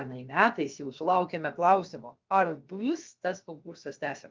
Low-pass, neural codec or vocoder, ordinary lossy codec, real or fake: 7.2 kHz; codec, 16 kHz, about 1 kbps, DyCAST, with the encoder's durations; Opus, 24 kbps; fake